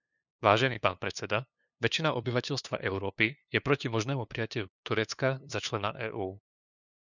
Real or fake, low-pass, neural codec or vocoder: fake; 7.2 kHz; codec, 16 kHz, 2 kbps, FunCodec, trained on LibriTTS, 25 frames a second